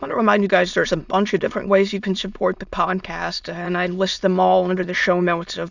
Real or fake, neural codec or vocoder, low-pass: fake; autoencoder, 22.05 kHz, a latent of 192 numbers a frame, VITS, trained on many speakers; 7.2 kHz